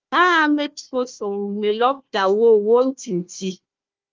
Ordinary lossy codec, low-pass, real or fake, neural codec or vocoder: Opus, 32 kbps; 7.2 kHz; fake; codec, 16 kHz, 1 kbps, FunCodec, trained on Chinese and English, 50 frames a second